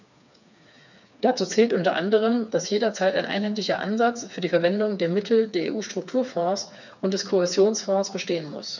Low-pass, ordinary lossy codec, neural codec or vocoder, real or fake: 7.2 kHz; none; codec, 16 kHz, 4 kbps, FreqCodec, smaller model; fake